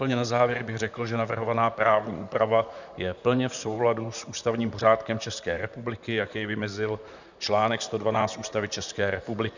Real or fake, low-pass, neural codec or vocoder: fake; 7.2 kHz; vocoder, 44.1 kHz, 128 mel bands, Pupu-Vocoder